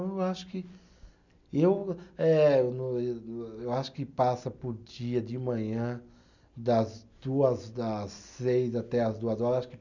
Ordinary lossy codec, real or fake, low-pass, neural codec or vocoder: none; real; 7.2 kHz; none